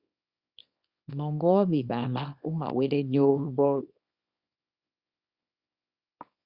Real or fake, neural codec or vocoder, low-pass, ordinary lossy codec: fake; codec, 24 kHz, 0.9 kbps, WavTokenizer, small release; 5.4 kHz; Opus, 64 kbps